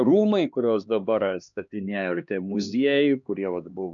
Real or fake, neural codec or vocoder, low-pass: fake; codec, 16 kHz, 2 kbps, X-Codec, HuBERT features, trained on LibriSpeech; 7.2 kHz